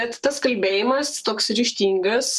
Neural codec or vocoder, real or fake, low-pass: codec, 44.1 kHz, 7.8 kbps, Pupu-Codec; fake; 14.4 kHz